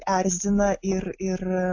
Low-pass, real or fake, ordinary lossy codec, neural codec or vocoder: 7.2 kHz; real; AAC, 48 kbps; none